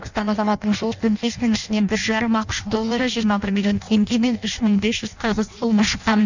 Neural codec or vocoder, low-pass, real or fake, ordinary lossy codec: codec, 16 kHz in and 24 kHz out, 0.6 kbps, FireRedTTS-2 codec; 7.2 kHz; fake; none